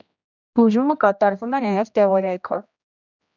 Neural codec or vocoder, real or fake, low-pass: codec, 16 kHz, 1 kbps, X-Codec, HuBERT features, trained on general audio; fake; 7.2 kHz